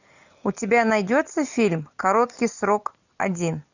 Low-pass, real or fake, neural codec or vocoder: 7.2 kHz; real; none